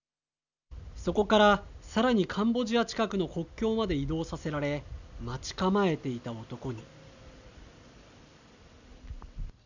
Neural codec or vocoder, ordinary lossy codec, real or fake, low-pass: none; none; real; 7.2 kHz